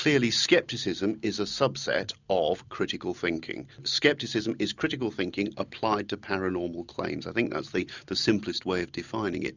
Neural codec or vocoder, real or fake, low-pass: none; real; 7.2 kHz